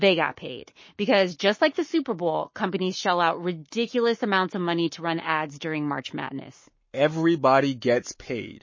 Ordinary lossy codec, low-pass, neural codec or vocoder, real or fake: MP3, 32 kbps; 7.2 kHz; codec, 44.1 kHz, 7.8 kbps, Pupu-Codec; fake